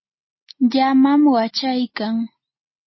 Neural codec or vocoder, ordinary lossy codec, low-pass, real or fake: none; MP3, 24 kbps; 7.2 kHz; real